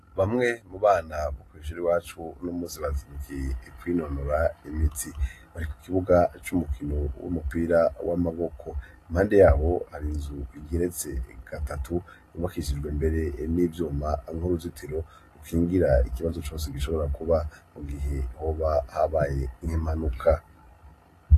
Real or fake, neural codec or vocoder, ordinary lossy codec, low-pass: real; none; AAC, 48 kbps; 14.4 kHz